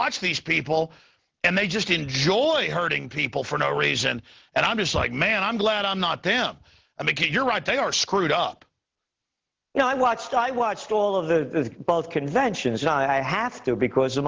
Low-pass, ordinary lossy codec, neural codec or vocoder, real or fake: 7.2 kHz; Opus, 16 kbps; none; real